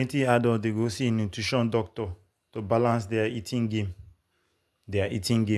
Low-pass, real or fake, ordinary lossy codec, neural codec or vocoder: none; real; none; none